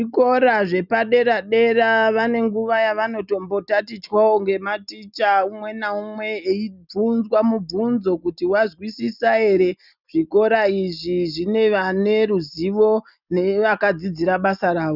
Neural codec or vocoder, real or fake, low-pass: none; real; 5.4 kHz